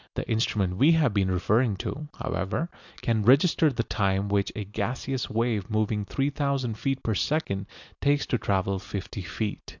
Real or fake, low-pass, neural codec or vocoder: real; 7.2 kHz; none